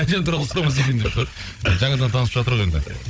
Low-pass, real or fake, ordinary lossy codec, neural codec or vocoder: none; fake; none; codec, 16 kHz, 16 kbps, FunCodec, trained on Chinese and English, 50 frames a second